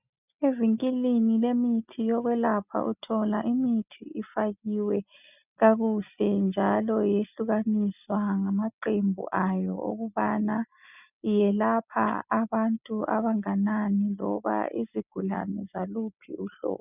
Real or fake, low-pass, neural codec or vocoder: real; 3.6 kHz; none